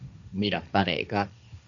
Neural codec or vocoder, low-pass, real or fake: codec, 16 kHz, 1.1 kbps, Voila-Tokenizer; 7.2 kHz; fake